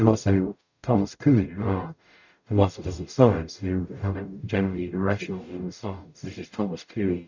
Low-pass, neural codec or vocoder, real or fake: 7.2 kHz; codec, 44.1 kHz, 0.9 kbps, DAC; fake